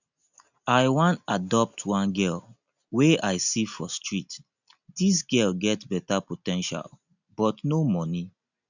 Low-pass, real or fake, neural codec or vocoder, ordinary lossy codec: 7.2 kHz; real; none; none